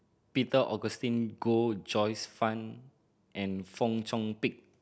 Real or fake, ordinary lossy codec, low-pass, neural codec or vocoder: real; none; none; none